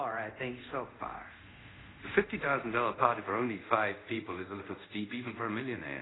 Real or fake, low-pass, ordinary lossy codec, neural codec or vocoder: fake; 7.2 kHz; AAC, 16 kbps; codec, 24 kHz, 0.5 kbps, DualCodec